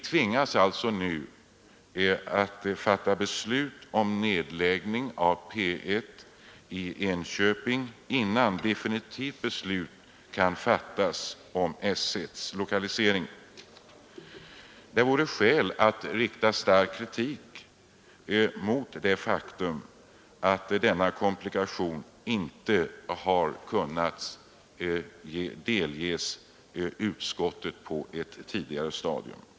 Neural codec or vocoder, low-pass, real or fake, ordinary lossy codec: none; none; real; none